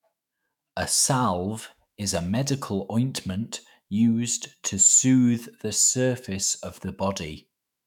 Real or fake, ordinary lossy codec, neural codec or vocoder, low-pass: fake; none; autoencoder, 48 kHz, 128 numbers a frame, DAC-VAE, trained on Japanese speech; 19.8 kHz